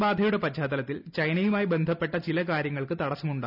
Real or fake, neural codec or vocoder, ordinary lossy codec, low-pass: real; none; none; 5.4 kHz